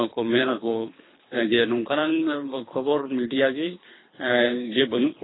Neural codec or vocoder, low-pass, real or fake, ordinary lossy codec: codec, 24 kHz, 3 kbps, HILCodec; 7.2 kHz; fake; AAC, 16 kbps